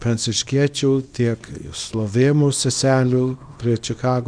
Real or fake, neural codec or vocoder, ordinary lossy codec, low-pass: fake; codec, 24 kHz, 0.9 kbps, WavTokenizer, small release; Opus, 64 kbps; 9.9 kHz